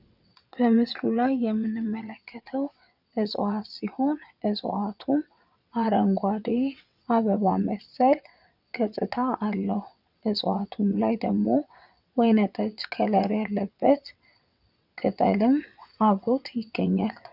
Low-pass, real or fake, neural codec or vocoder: 5.4 kHz; fake; vocoder, 22.05 kHz, 80 mel bands, WaveNeXt